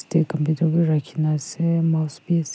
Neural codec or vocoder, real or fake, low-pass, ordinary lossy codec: none; real; none; none